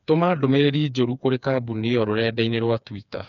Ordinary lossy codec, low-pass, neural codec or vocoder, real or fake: none; 7.2 kHz; codec, 16 kHz, 4 kbps, FreqCodec, smaller model; fake